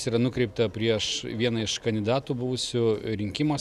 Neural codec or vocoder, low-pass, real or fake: none; 14.4 kHz; real